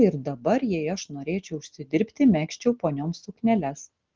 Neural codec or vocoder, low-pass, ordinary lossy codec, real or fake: none; 7.2 kHz; Opus, 16 kbps; real